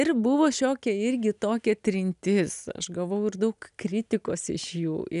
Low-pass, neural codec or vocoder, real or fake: 10.8 kHz; none; real